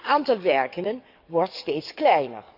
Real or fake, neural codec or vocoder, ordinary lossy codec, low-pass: fake; codec, 44.1 kHz, 7.8 kbps, DAC; none; 5.4 kHz